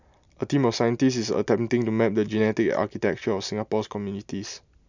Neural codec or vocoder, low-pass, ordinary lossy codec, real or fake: none; 7.2 kHz; none; real